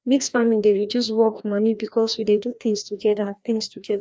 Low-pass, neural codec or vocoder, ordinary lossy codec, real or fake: none; codec, 16 kHz, 1 kbps, FreqCodec, larger model; none; fake